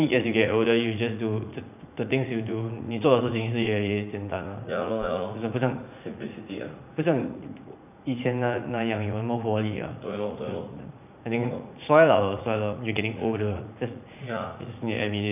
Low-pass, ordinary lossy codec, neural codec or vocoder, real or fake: 3.6 kHz; none; vocoder, 44.1 kHz, 80 mel bands, Vocos; fake